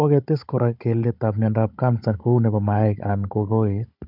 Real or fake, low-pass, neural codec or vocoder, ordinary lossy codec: fake; 5.4 kHz; codec, 16 kHz, 16 kbps, FunCodec, trained on Chinese and English, 50 frames a second; none